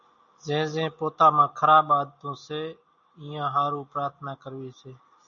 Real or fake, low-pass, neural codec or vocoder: real; 7.2 kHz; none